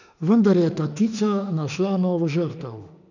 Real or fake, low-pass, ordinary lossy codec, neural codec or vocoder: fake; 7.2 kHz; none; autoencoder, 48 kHz, 32 numbers a frame, DAC-VAE, trained on Japanese speech